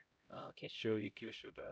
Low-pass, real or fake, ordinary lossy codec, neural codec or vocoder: none; fake; none; codec, 16 kHz, 0.5 kbps, X-Codec, HuBERT features, trained on LibriSpeech